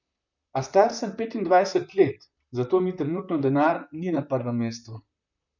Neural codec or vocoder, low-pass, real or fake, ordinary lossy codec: vocoder, 44.1 kHz, 128 mel bands, Pupu-Vocoder; 7.2 kHz; fake; none